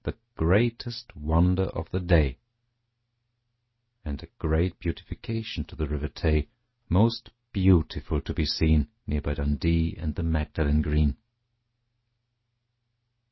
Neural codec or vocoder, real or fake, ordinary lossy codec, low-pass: none; real; MP3, 24 kbps; 7.2 kHz